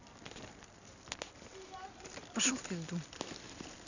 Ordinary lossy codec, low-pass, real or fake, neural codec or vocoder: none; 7.2 kHz; real; none